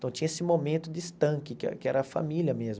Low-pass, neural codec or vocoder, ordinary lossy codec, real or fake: none; none; none; real